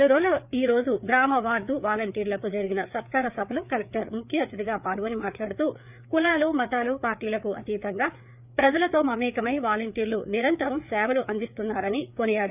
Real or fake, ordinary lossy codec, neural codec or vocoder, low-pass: fake; none; codec, 16 kHz, 4 kbps, FreqCodec, larger model; 3.6 kHz